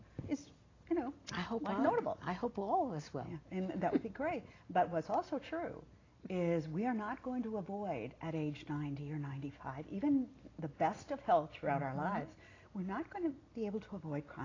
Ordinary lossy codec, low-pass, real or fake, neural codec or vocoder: AAC, 32 kbps; 7.2 kHz; real; none